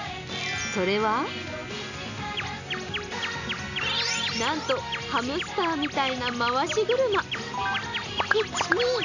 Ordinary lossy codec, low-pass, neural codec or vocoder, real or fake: none; 7.2 kHz; none; real